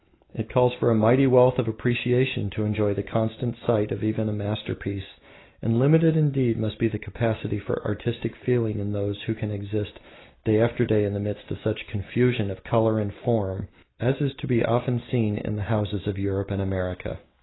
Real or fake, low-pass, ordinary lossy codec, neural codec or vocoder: real; 7.2 kHz; AAC, 16 kbps; none